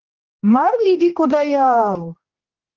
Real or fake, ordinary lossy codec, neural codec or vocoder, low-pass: fake; Opus, 16 kbps; codec, 16 kHz, 1 kbps, X-Codec, HuBERT features, trained on general audio; 7.2 kHz